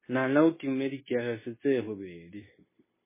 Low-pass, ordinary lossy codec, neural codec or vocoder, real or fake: 3.6 kHz; MP3, 16 kbps; codec, 16 kHz, 0.9 kbps, LongCat-Audio-Codec; fake